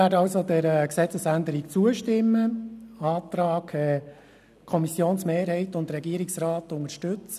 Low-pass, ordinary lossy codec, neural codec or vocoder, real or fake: 14.4 kHz; none; none; real